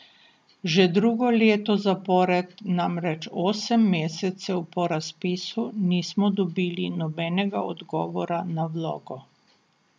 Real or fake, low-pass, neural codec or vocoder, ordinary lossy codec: real; none; none; none